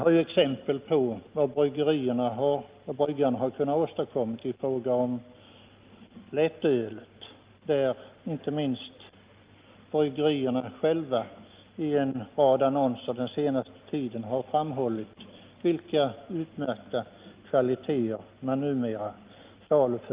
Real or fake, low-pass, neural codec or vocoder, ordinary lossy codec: real; 3.6 kHz; none; Opus, 32 kbps